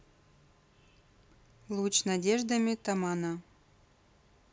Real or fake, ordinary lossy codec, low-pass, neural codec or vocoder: real; none; none; none